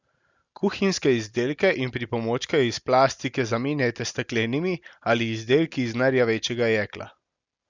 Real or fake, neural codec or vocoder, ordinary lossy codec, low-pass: real; none; Opus, 64 kbps; 7.2 kHz